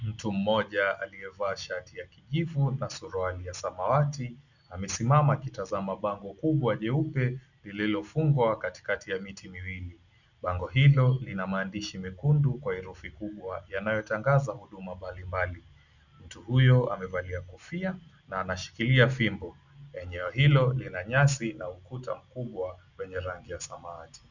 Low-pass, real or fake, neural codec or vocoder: 7.2 kHz; real; none